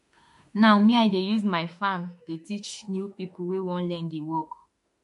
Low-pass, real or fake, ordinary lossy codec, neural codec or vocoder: 14.4 kHz; fake; MP3, 48 kbps; autoencoder, 48 kHz, 32 numbers a frame, DAC-VAE, trained on Japanese speech